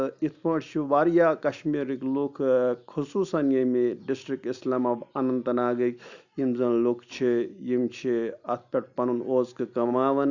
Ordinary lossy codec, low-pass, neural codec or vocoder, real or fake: none; 7.2 kHz; none; real